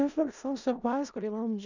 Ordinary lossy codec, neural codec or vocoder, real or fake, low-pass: none; codec, 16 kHz in and 24 kHz out, 0.4 kbps, LongCat-Audio-Codec, four codebook decoder; fake; 7.2 kHz